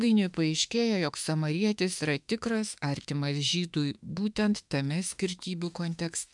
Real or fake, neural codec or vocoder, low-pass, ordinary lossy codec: fake; autoencoder, 48 kHz, 32 numbers a frame, DAC-VAE, trained on Japanese speech; 10.8 kHz; MP3, 96 kbps